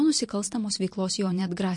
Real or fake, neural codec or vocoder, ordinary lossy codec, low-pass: fake; vocoder, 44.1 kHz, 128 mel bands every 512 samples, BigVGAN v2; MP3, 48 kbps; 10.8 kHz